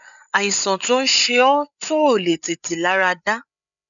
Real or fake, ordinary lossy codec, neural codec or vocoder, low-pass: fake; none; codec, 16 kHz, 8 kbps, FreqCodec, larger model; 7.2 kHz